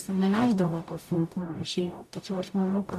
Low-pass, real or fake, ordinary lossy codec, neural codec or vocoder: 14.4 kHz; fake; AAC, 96 kbps; codec, 44.1 kHz, 0.9 kbps, DAC